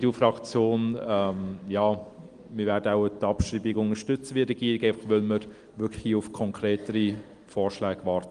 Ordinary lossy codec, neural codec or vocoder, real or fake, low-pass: Opus, 24 kbps; none; real; 9.9 kHz